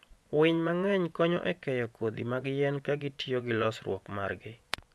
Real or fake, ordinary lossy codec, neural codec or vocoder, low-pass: fake; none; vocoder, 24 kHz, 100 mel bands, Vocos; none